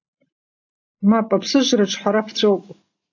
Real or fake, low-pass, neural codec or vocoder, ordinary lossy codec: real; 7.2 kHz; none; AAC, 48 kbps